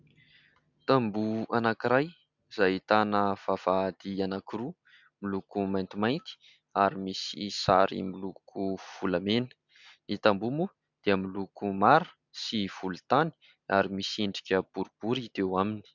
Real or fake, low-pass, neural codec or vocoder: real; 7.2 kHz; none